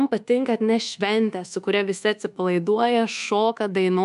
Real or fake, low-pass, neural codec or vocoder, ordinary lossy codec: fake; 10.8 kHz; codec, 24 kHz, 1.2 kbps, DualCodec; AAC, 96 kbps